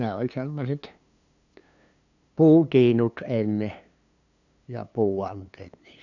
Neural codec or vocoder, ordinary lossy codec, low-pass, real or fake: codec, 16 kHz, 2 kbps, FunCodec, trained on LibriTTS, 25 frames a second; none; 7.2 kHz; fake